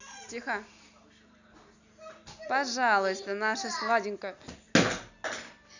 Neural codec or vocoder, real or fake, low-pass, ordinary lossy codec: none; real; 7.2 kHz; none